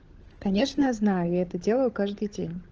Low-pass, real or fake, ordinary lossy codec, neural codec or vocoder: 7.2 kHz; fake; Opus, 16 kbps; codec, 16 kHz, 16 kbps, FunCodec, trained on LibriTTS, 50 frames a second